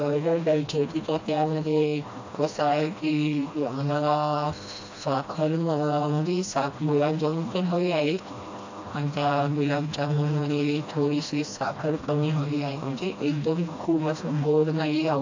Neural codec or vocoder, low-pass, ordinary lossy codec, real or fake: codec, 16 kHz, 1 kbps, FreqCodec, smaller model; 7.2 kHz; AAC, 48 kbps; fake